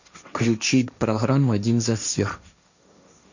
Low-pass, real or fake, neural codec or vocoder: 7.2 kHz; fake; codec, 16 kHz, 1.1 kbps, Voila-Tokenizer